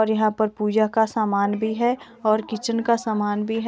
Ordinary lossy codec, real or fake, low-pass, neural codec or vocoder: none; real; none; none